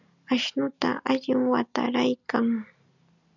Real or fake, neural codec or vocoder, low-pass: real; none; 7.2 kHz